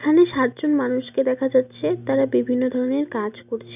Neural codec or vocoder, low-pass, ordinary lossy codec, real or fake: none; 3.6 kHz; none; real